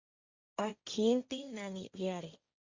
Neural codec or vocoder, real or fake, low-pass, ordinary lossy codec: codec, 16 kHz, 1.1 kbps, Voila-Tokenizer; fake; 7.2 kHz; Opus, 64 kbps